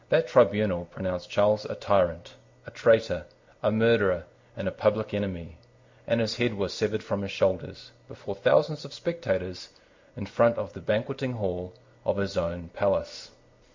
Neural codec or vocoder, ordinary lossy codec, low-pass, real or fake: none; MP3, 64 kbps; 7.2 kHz; real